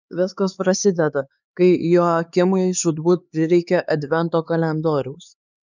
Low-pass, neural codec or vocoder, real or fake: 7.2 kHz; codec, 16 kHz, 4 kbps, X-Codec, HuBERT features, trained on LibriSpeech; fake